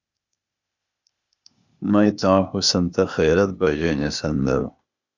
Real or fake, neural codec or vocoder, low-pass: fake; codec, 16 kHz, 0.8 kbps, ZipCodec; 7.2 kHz